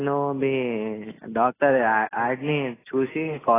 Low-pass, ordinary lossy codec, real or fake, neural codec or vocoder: 3.6 kHz; AAC, 16 kbps; real; none